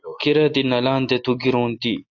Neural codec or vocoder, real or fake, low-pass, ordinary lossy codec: none; real; 7.2 kHz; AAC, 48 kbps